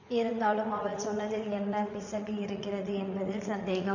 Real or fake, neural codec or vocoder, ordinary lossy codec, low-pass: fake; vocoder, 44.1 kHz, 80 mel bands, Vocos; MP3, 64 kbps; 7.2 kHz